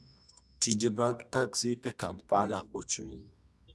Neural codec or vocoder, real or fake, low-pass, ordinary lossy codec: codec, 24 kHz, 0.9 kbps, WavTokenizer, medium music audio release; fake; none; none